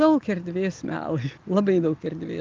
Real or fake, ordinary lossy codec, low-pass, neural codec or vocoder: real; Opus, 32 kbps; 7.2 kHz; none